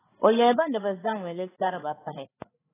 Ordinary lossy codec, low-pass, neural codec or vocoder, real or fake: AAC, 16 kbps; 3.6 kHz; codec, 16 kHz, 8 kbps, FreqCodec, larger model; fake